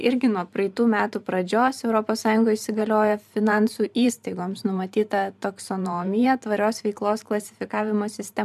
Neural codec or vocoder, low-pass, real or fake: vocoder, 44.1 kHz, 128 mel bands every 512 samples, BigVGAN v2; 14.4 kHz; fake